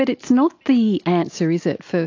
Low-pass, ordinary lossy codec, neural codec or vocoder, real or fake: 7.2 kHz; AAC, 48 kbps; none; real